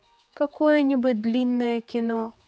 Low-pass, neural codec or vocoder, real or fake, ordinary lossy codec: none; codec, 16 kHz, 4 kbps, X-Codec, HuBERT features, trained on general audio; fake; none